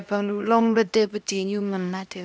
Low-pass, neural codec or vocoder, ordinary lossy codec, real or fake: none; codec, 16 kHz, 1 kbps, X-Codec, HuBERT features, trained on LibriSpeech; none; fake